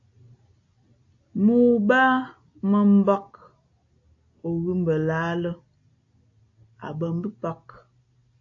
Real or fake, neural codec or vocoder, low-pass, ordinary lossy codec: real; none; 7.2 kHz; AAC, 48 kbps